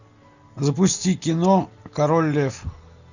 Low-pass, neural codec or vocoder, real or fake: 7.2 kHz; none; real